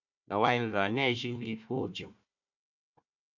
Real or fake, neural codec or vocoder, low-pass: fake; codec, 16 kHz, 1 kbps, FunCodec, trained on Chinese and English, 50 frames a second; 7.2 kHz